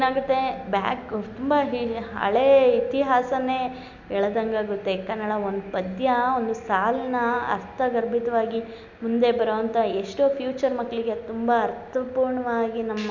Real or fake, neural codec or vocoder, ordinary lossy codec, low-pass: real; none; MP3, 64 kbps; 7.2 kHz